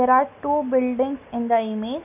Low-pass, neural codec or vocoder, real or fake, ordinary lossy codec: 3.6 kHz; none; real; none